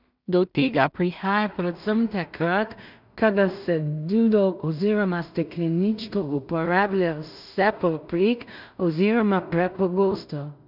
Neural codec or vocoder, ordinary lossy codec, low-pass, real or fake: codec, 16 kHz in and 24 kHz out, 0.4 kbps, LongCat-Audio-Codec, two codebook decoder; none; 5.4 kHz; fake